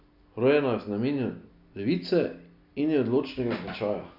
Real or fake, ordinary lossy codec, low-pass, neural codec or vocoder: real; none; 5.4 kHz; none